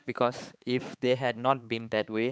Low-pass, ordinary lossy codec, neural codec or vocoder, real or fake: none; none; codec, 16 kHz, 4 kbps, X-Codec, HuBERT features, trained on balanced general audio; fake